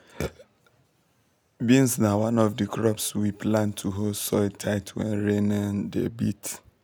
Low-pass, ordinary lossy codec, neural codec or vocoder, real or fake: none; none; none; real